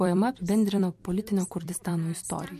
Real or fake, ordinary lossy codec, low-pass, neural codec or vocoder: fake; MP3, 64 kbps; 14.4 kHz; vocoder, 44.1 kHz, 128 mel bands every 256 samples, BigVGAN v2